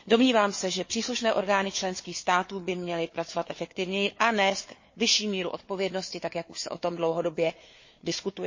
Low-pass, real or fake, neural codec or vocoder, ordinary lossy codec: 7.2 kHz; fake; codec, 16 kHz, 4 kbps, FunCodec, trained on Chinese and English, 50 frames a second; MP3, 32 kbps